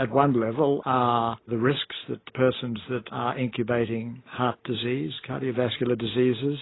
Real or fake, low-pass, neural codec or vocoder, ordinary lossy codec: real; 7.2 kHz; none; AAC, 16 kbps